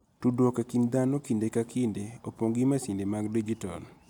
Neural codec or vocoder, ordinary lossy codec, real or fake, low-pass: none; none; real; 19.8 kHz